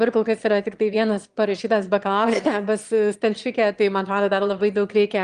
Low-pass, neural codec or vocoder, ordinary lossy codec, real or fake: 9.9 kHz; autoencoder, 22.05 kHz, a latent of 192 numbers a frame, VITS, trained on one speaker; Opus, 24 kbps; fake